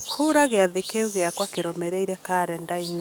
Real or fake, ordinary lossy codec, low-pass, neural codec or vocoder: fake; none; none; codec, 44.1 kHz, 7.8 kbps, DAC